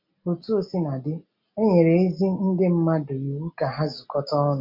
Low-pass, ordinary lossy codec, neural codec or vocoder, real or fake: 5.4 kHz; MP3, 48 kbps; none; real